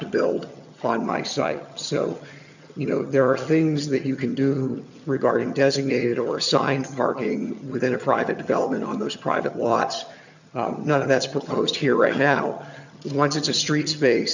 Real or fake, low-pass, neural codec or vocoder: fake; 7.2 kHz; vocoder, 22.05 kHz, 80 mel bands, HiFi-GAN